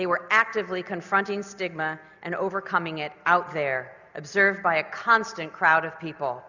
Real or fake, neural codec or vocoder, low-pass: real; none; 7.2 kHz